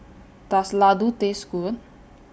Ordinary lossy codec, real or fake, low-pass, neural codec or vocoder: none; real; none; none